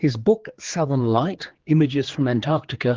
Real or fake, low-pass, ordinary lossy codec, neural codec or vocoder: fake; 7.2 kHz; Opus, 16 kbps; codec, 16 kHz, 4 kbps, X-Codec, HuBERT features, trained on general audio